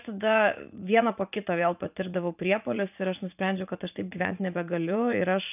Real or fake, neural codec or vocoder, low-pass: fake; vocoder, 44.1 kHz, 80 mel bands, Vocos; 3.6 kHz